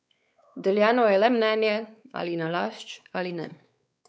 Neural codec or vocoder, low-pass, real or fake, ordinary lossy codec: codec, 16 kHz, 4 kbps, X-Codec, WavLM features, trained on Multilingual LibriSpeech; none; fake; none